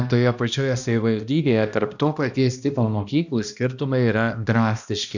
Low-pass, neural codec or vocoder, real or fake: 7.2 kHz; codec, 16 kHz, 1 kbps, X-Codec, HuBERT features, trained on balanced general audio; fake